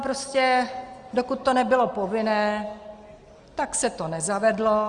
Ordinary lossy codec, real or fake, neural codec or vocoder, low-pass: Opus, 32 kbps; real; none; 9.9 kHz